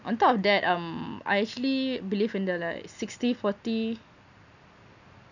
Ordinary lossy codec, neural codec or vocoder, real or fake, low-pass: none; none; real; 7.2 kHz